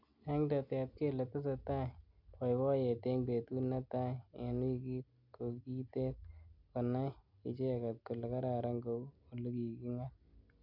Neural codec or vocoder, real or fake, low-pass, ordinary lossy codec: none; real; 5.4 kHz; none